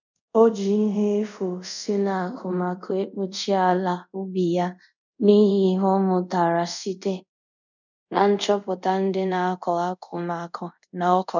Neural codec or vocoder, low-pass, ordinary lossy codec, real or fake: codec, 24 kHz, 0.5 kbps, DualCodec; 7.2 kHz; none; fake